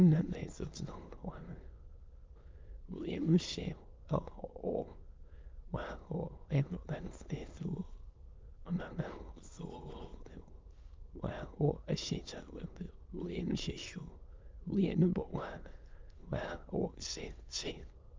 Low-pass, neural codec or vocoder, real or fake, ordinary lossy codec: 7.2 kHz; autoencoder, 22.05 kHz, a latent of 192 numbers a frame, VITS, trained on many speakers; fake; Opus, 24 kbps